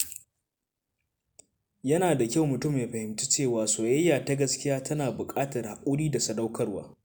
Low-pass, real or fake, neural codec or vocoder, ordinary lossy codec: none; real; none; none